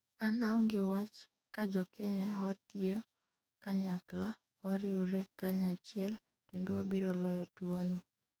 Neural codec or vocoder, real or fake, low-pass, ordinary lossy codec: codec, 44.1 kHz, 2.6 kbps, DAC; fake; none; none